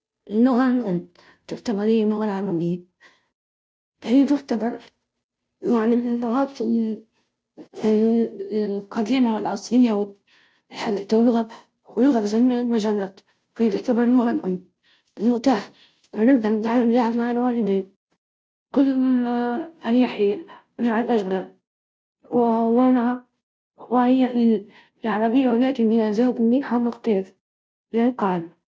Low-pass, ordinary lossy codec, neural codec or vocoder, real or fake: none; none; codec, 16 kHz, 0.5 kbps, FunCodec, trained on Chinese and English, 25 frames a second; fake